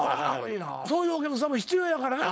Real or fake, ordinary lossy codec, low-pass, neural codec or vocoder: fake; none; none; codec, 16 kHz, 4.8 kbps, FACodec